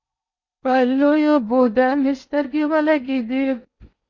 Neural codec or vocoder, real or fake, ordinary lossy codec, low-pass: codec, 16 kHz in and 24 kHz out, 0.8 kbps, FocalCodec, streaming, 65536 codes; fake; MP3, 64 kbps; 7.2 kHz